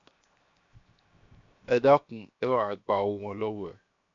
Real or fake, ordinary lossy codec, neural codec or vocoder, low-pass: fake; AAC, 64 kbps; codec, 16 kHz, 0.7 kbps, FocalCodec; 7.2 kHz